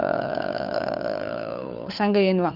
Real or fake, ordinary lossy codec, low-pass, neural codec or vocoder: fake; Opus, 64 kbps; 5.4 kHz; codec, 16 kHz, 4 kbps, FunCodec, trained on LibriTTS, 50 frames a second